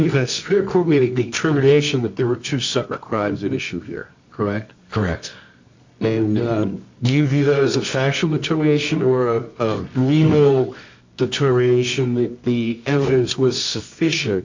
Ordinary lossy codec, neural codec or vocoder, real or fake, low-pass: MP3, 64 kbps; codec, 24 kHz, 0.9 kbps, WavTokenizer, medium music audio release; fake; 7.2 kHz